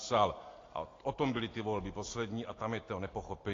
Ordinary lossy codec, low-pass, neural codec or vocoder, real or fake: AAC, 32 kbps; 7.2 kHz; none; real